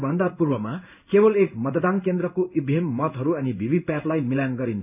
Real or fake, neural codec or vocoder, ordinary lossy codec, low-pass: fake; codec, 16 kHz in and 24 kHz out, 1 kbps, XY-Tokenizer; none; 3.6 kHz